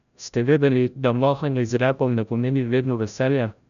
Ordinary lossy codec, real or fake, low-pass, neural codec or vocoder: none; fake; 7.2 kHz; codec, 16 kHz, 0.5 kbps, FreqCodec, larger model